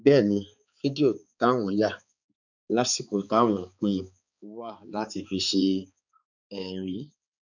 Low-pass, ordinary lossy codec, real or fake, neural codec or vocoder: 7.2 kHz; none; fake; codec, 16 kHz, 4 kbps, X-Codec, HuBERT features, trained on balanced general audio